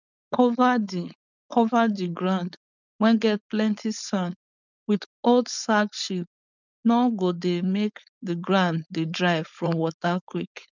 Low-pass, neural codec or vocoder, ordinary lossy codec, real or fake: 7.2 kHz; codec, 16 kHz, 4.8 kbps, FACodec; none; fake